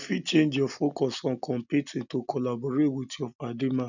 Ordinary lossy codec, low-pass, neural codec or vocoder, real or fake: none; 7.2 kHz; none; real